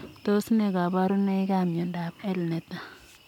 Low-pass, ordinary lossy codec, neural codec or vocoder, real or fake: 19.8 kHz; MP3, 96 kbps; none; real